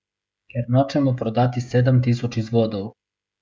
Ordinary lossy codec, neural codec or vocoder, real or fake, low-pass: none; codec, 16 kHz, 16 kbps, FreqCodec, smaller model; fake; none